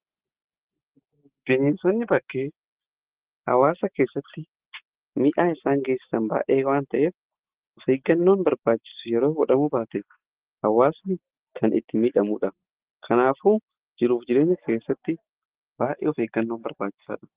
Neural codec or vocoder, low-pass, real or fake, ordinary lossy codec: none; 3.6 kHz; real; Opus, 16 kbps